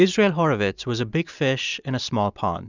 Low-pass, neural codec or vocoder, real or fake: 7.2 kHz; none; real